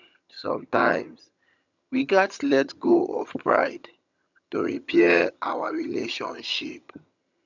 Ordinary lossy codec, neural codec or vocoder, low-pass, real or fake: none; vocoder, 22.05 kHz, 80 mel bands, HiFi-GAN; 7.2 kHz; fake